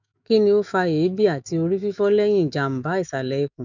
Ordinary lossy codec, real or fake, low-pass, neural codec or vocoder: none; fake; 7.2 kHz; autoencoder, 48 kHz, 128 numbers a frame, DAC-VAE, trained on Japanese speech